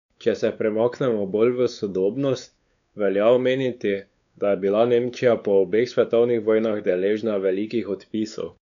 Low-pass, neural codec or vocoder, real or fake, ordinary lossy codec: 7.2 kHz; codec, 16 kHz, 4 kbps, X-Codec, WavLM features, trained on Multilingual LibriSpeech; fake; none